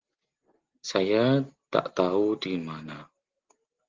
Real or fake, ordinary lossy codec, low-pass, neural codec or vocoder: real; Opus, 16 kbps; 7.2 kHz; none